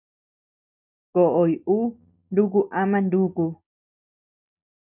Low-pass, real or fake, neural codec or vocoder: 3.6 kHz; real; none